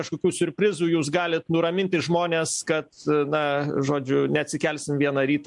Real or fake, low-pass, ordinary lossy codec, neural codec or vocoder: real; 10.8 kHz; MP3, 64 kbps; none